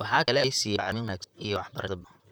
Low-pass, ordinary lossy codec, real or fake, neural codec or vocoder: none; none; fake; vocoder, 44.1 kHz, 128 mel bands, Pupu-Vocoder